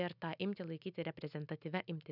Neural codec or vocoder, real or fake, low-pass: none; real; 5.4 kHz